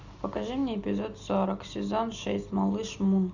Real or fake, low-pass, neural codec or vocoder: real; 7.2 kHz; none